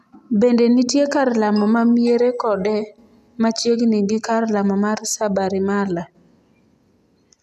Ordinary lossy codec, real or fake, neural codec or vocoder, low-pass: none; real; none; 14.4 kHz